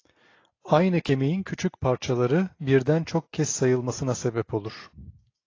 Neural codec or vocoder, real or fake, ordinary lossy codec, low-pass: none; real; AAC, 32 kbps; 7.2 kHz